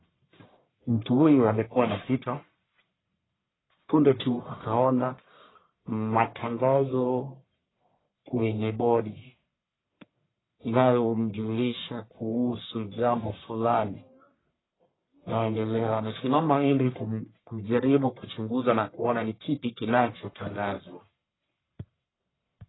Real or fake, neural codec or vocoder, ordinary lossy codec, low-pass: fake; codec, 44.1 kHz, 1.7 kbps, Pupu-Codec; AAC, 16 kbps; 7.2 kHz